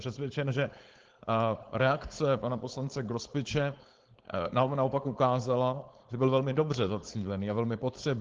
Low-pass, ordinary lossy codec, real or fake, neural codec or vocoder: 7.2 kHz; Opus, 16 kbps; fake; codec, 16 kHz, 4.8 kbps, FACodec